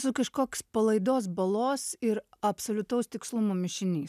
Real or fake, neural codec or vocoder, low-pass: real; none; 14.4 kHz